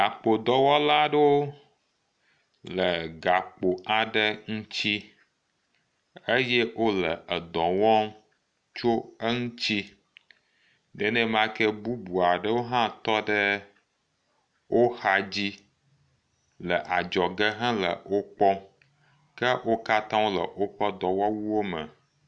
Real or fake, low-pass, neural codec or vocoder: real; 9.9 kHz; none